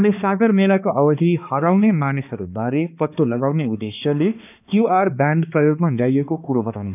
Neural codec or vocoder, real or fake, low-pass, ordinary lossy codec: codec, 16 kHz, 2 kbps, X-Codec, HuBERT features, trained on balanced general audio; fake; 3.6 kHz; none